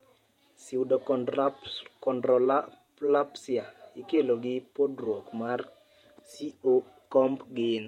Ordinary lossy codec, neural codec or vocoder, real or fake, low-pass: MP3, 64 kbps; vocoder, 48 kHz, 128 mel bands, Vocos; fake; 19.8 kHz